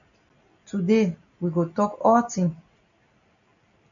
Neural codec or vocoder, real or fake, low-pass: none; real; 7.2 kHz